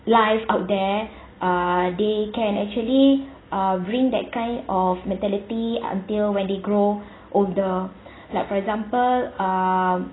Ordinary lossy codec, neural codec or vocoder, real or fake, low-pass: AAC, 16 kbps; none; real; 7.2 kHz